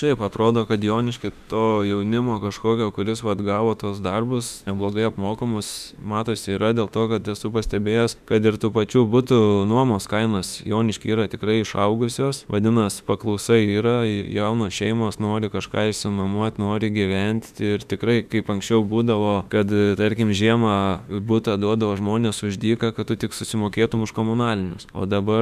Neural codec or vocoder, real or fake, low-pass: autoencoder, 48 kHz, 32 numbers a frame, DAC-VAE, trained on Japanese speech; fake; 14.4 kHz